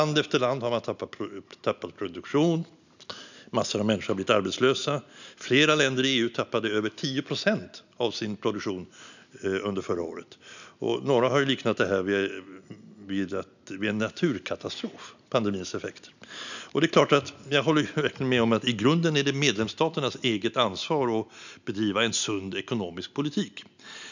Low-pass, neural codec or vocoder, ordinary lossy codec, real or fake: 7.2 kHz; none; none; real